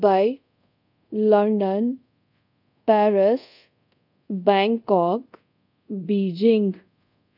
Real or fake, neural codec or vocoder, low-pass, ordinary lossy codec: fake; codec, 24 kHz, 0.5 kbps, DualCodec; 5.4 kHz; none